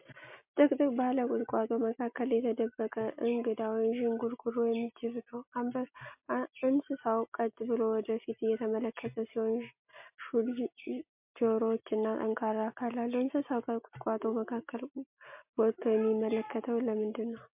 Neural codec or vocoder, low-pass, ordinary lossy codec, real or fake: none; 3.6 kHz; MP3, 32 kbps; real